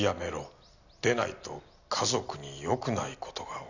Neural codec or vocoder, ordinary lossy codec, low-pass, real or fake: none; none; 7.2 kHz; real